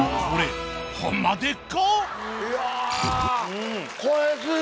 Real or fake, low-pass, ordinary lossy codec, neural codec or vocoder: real; none; none; none